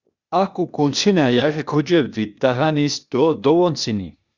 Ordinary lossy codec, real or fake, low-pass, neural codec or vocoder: Opus, 64 kbps; fake; 7.2 kHz; codec, 16 kHz, 0.8 kbps, ZipCodec